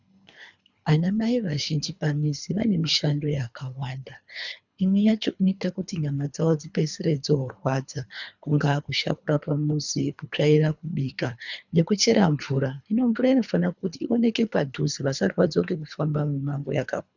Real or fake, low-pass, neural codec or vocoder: fake; 7.2 kHz; codec, 24 kHz, 3 kbps, HILCodec